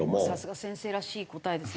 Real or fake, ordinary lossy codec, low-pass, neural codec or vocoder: real; none; none; none